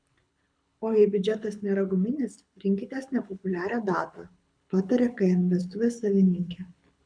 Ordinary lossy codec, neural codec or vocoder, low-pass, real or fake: MP3, 96 kbps; codec, 24 kHz, 6 kbps, HILCodec; 9.9 kHz; fake